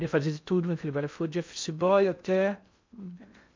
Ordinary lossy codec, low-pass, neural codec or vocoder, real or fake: MP3, 64 kbps; 7.2 kHz; codec, 16 kHz in and 24 kHz out, 0.6 kbps, FocalCodec, streaming, 2048 codes; fake